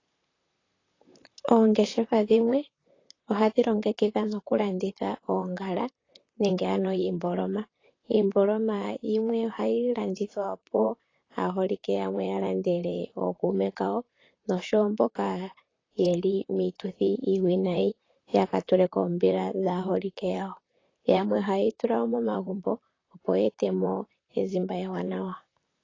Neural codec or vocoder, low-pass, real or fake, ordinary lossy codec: vocoder, 44.1 kHz, 128 mel bands, Pupu-Vocoder; 7.2 kHz; fake; AAC, 32 kbps